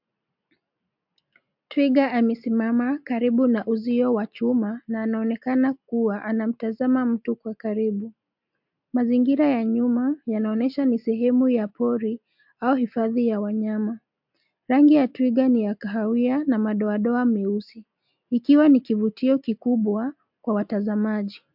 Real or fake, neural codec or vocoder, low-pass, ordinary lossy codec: real; none; 5.4 kHz; MP3, 48 kbps